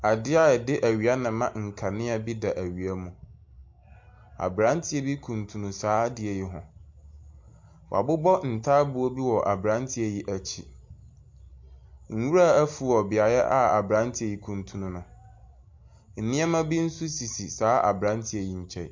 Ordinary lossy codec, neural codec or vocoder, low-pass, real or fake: MP3, 48 kbps; none; 7.2 kHz; real